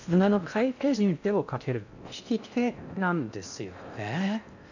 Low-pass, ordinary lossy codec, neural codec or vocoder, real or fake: 7.2 kHz; none; codec, 16 kHz in and 24 kHz out, 0.6 kbps, FocalCodec, streaming, 4096 codes; fake